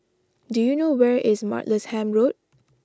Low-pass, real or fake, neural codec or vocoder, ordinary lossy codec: none; real; none; none